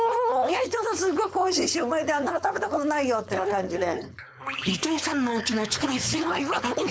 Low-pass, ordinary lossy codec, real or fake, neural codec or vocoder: none; none; fake; codec, 16 kHz, 4.8 kbps, FACodec